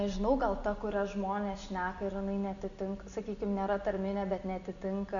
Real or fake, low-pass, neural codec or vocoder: real; 7.2 kHz; none